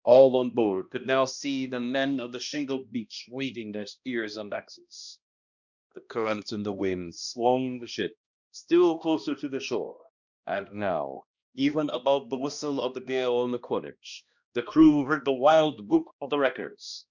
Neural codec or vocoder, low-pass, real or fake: codec, 16 kHz, 1 kbps, X-Codec, HuBERT features, trained on balanced general audio; 7.2 kHz; fake